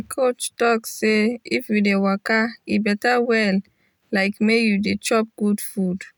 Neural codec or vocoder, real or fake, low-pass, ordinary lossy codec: none; real; none; none